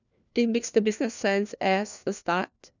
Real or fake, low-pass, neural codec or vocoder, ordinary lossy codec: fake; 7.2 kHz; codec, 16 kHz, 1 kbps, FunCodec, trained on LibriTTS, 50 frames a second; none